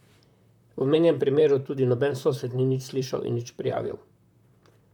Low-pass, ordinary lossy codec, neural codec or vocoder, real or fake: 19.8 kHz; none; vocoder, 44.1 kHz, 128 mel bands, Pupu-Vocoder; fake